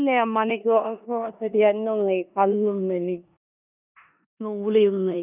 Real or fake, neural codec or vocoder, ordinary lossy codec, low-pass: fake; codec, 16 kHz in and 24 kHz out, 0.9 kbps, LongCat-Audio-Codec, four codebook decoder; none; 3.6 kHz